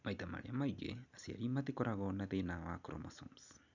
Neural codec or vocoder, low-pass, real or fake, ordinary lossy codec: none; 7.2 kHz; real; none